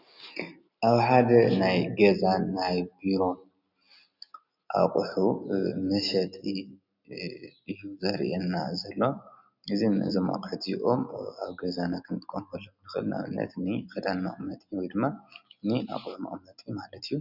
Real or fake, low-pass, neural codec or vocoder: real; 5.4 kHz; none